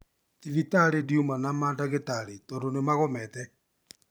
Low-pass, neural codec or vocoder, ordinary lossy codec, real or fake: none; vocoder, 44.1 kHz, 128 mel bands every 512 samples, BigVGAN v2; none; fake